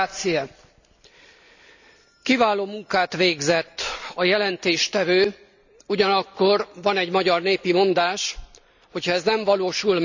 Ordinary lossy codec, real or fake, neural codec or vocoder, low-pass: none; real; none; 7.2 kHz